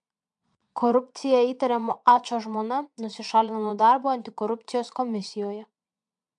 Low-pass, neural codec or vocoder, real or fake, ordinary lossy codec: 9.9 kHz; vocoder, 22.05 kHz, 80 mel bands, Vocos; fake; MP3, 64 kbps